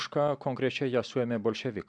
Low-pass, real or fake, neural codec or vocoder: 9.9 kHz; real; none